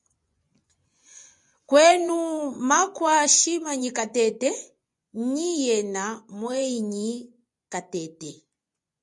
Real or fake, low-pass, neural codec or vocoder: fake; 10.8 kHz; vocoder, 24 kHz, 100 mel bands, Vocos